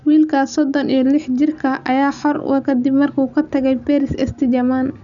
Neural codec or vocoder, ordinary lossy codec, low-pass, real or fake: none; none; 7.2 kHz; real